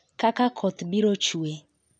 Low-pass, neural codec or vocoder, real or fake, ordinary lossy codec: 9.9 kHz; none; real; none